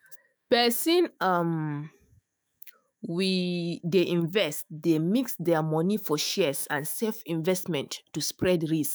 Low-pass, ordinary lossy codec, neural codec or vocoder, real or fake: none; none; autoencoder, 48 kHz, 128 numbers a frame, DAC-VAE, trained on Japanese speech; fake